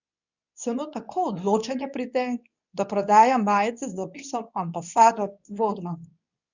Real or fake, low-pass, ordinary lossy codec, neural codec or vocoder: fake; 7.2 kHz; none; codec, 24 kHz, 0.9 kbps, WavTokenizer, medium speech release version 2